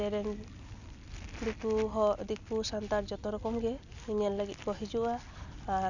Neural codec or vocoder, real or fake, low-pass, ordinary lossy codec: none; real; 7.2 kHz; none